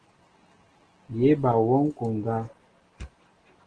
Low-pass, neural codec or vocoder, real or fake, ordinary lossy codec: 9.9 kHz; none; real; Opus, 16 kbps